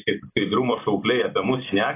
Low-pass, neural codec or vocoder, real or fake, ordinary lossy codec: 3.6 kHz; vocoder, 44.1 kHz, 128 mel bands every 256 samples, BigVGAN v2; fake; AAC, 24 kbps